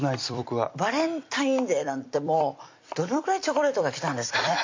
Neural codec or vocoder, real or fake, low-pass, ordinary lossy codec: vocoder, 44.1 kHz, 128 mel bands every 256 samples, BigVGAN v2; fake; 7.2 kHz; MP3, 48 kbps